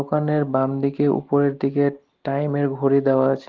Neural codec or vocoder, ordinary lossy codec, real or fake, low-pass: none; Opus, 16 kbps; real; 7.2 kHz